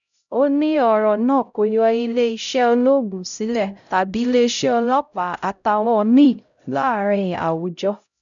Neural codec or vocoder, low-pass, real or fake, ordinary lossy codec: codec, 16 kHz, 0.5 kbps, X-Codec, HuBERT features, trained on LibriSpeech; 7.2 kHz; fake; none